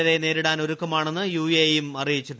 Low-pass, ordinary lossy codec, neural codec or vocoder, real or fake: none; none; none; real